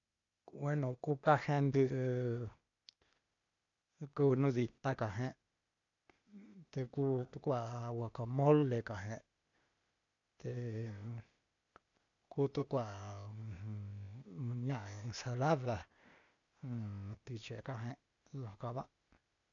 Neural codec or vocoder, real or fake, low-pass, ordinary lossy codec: codec, 16 kHz, 0.8 kbps, ZipCodec; fake; 7.2 kHz; none